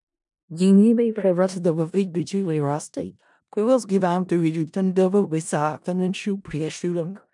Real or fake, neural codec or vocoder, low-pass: fake; codec, 16 kHz in and 24 kHz out, 0.4 kbps, LongCat-Audio-Codec, four codebook decoder; 10.8 kHz